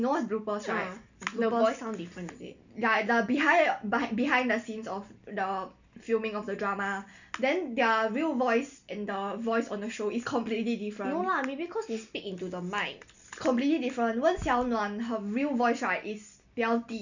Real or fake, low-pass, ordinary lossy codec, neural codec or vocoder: real; 7.2 kHz; none; none